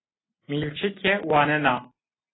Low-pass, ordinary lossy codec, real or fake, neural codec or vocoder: 7.2 kHz; AAC, 16 kbps; real; none